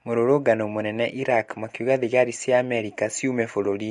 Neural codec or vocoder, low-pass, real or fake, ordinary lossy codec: none; 14.4 kHz; real; MP3, 48 kbps